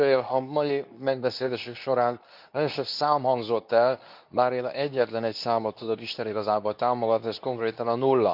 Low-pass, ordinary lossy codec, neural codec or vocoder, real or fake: 5.4 kHz; none; codec, 24 kHz, 0.9 kbps, WavTokenizer, medium speech release version 2; fake